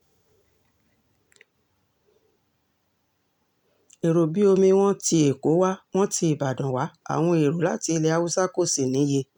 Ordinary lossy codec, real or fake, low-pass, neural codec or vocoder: none; real; 19.8 kHz; none